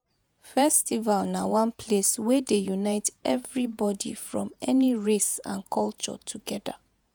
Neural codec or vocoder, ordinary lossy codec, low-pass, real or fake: vocoder, 48 kHz, 128 mel bands, Vocos; none; none; fake